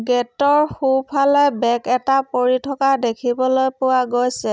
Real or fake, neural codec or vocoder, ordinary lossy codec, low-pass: real; none; none; none